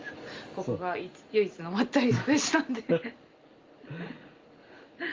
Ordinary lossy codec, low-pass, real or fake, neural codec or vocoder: Opus, 32 kbps; 7.2 kHz; real; none